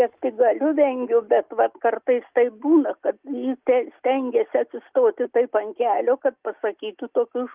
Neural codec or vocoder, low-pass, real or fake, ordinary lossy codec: none; 3.6 kHz; real; Opus, 32 kbps